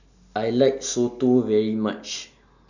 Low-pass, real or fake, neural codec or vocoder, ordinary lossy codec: 7.2 kHz; real; none; none